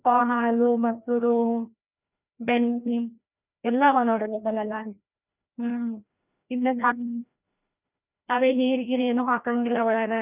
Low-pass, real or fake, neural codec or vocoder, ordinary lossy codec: 3.6 kHz; fake; codec, 16 kHz, 1 kbps, FreqCodec, larger model; none